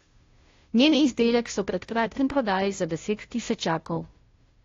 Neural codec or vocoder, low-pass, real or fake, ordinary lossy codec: codec, 16 kHz, 0.5 kbps, FunCodec, trained on Chinese and English, 25 frames a second; 7.2 kHz; fake; AAC, 32 kbps